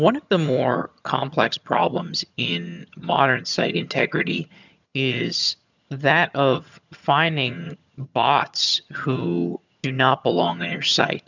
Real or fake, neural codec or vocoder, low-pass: fake; vocoder, 22.05 kHz, 80 mel bands, HiFi-GAN; 7.2 kHz